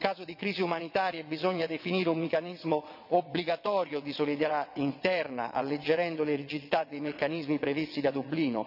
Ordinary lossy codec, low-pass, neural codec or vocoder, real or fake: none; 5.4 kHz; vocoder, 22.05 kHz, 80 mel bands, WaveNeXt; fake